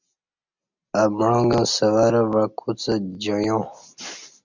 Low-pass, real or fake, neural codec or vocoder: 7.2 kHz; real; none